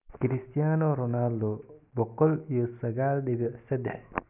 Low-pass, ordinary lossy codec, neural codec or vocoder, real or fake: 3.6 kHz; none; none; real